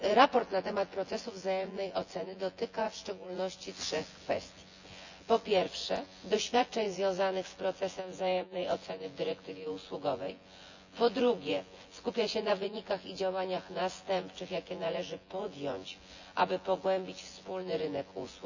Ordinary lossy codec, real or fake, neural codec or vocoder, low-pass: none; fake; vocoder, 24 kHz, 100 mel bands, Vocos; 7.2 kHz